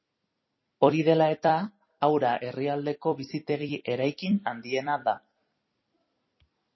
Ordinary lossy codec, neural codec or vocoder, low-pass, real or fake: MP3, 24 kbps; vocoder, 44.1 kHz, 128 mel bands every 256 samples, BigVGAN v2; 7.2 kHz; fake